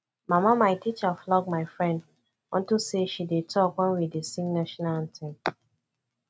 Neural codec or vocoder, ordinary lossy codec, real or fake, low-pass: none; none; real; none